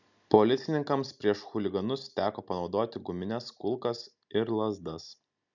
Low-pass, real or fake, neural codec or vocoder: 7.2 kHz; real; none